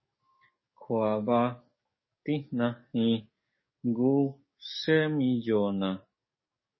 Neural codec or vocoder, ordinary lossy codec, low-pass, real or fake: codec, 44.1 kHz, 7.8 kbps, DAC; MP3, 24 kbps; 7.2 kHz; fake